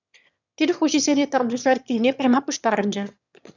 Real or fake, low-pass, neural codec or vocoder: fake; 7.2 kHz; autoencoder, 22.05 kHz, a latent of 192 numbers a frame, VITS, trained on one speaker